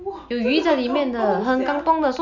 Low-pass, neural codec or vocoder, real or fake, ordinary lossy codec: 7.2 kHz; none; real; none